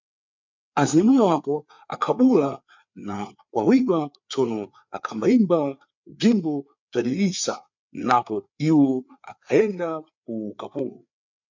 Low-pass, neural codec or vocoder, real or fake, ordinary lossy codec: 7.2 kHz; codec, 16 kHz, 4 kbps, FreqCodec, larger model; fake; MP3, 64 kbps